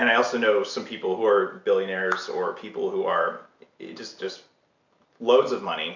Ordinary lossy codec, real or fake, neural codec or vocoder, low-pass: MP3, 64 kbps; real; none; 7.2 kHz